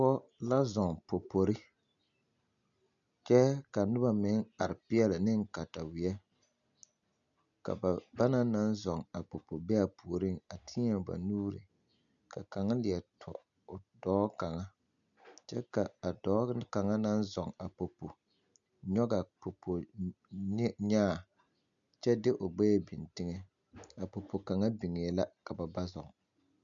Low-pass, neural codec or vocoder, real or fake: 7.2 kHz; none; real